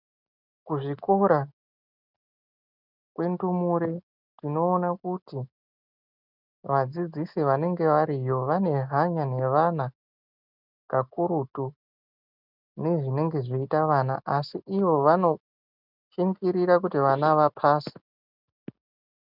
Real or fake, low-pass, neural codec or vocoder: real; 5.4 kHz; none